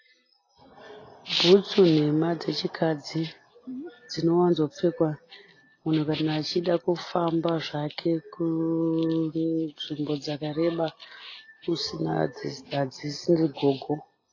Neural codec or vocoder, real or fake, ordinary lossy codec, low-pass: none; real; AAC, 32 kbps; 7.2 kHz